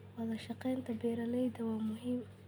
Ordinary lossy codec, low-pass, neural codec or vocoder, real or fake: none; none; none; real